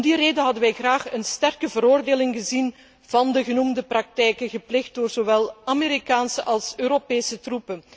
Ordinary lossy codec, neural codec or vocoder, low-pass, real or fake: none; none; none; real